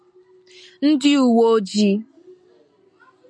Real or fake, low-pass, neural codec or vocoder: real; 9.9 kHz; none